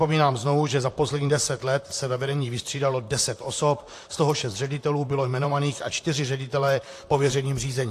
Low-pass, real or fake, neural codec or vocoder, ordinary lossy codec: 14.4 kHz; fake; vocoder, 44.1 kHz, 128 mel bands, Pupu-Vocoder; AAC, 64 kbps